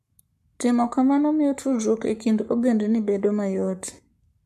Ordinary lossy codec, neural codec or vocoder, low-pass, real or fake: MP3, 64 kbps; codec, 44.1 kHz, 7.8 kbps, Pupu-Codec; 14.4 kHz; fake